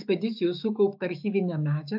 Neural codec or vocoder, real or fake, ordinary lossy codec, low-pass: autoencoder, 48 kHz, 128 numbers a frame, DAC-VAE, trained on Japanese speech; fake; AAC, 48 kbps; 5.4 kHz